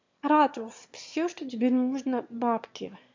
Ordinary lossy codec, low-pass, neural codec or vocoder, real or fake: MP3, 48 kbps; 7.2 kHz; autoencoder, 22.05 kHz, a latent of 192 numbers a frame, VITS, trained on one speaker; fake